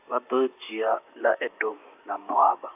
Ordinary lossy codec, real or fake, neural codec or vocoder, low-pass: AAC, 32 kbps; fake; vocoder, 44.1 kHz, 128 mel bands, Pupu-Vocoder; 3.6 kHz